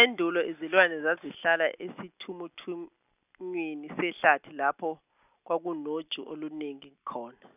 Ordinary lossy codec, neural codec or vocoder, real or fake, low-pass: none; none; real; 3.6 kHz